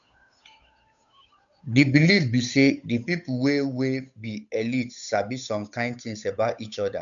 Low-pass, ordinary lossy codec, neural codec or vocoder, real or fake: 7.2 kHz; none; codec, 16 kHz, 8 kbps, FunCodec, trained on Chinese and English, 25 frames a second; fake